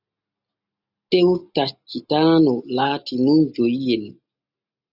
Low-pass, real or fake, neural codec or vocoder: 5.4 kHz; real; none